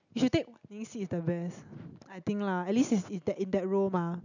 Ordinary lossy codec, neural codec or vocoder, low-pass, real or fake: none; none; 7.2 kHz; real